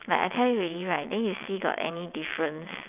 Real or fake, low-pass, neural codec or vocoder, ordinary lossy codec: fake; 3.6 kHz; vocoder, 22.05 kHz, 80 mel bands, WaveNeXt; none